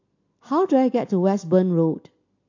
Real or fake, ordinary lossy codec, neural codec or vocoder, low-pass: real; AAC, 48 kbps; none; 7.2 kHz